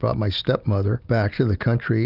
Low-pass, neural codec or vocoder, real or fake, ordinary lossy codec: 5.4 kHz; none; real; Opus, 24 kbps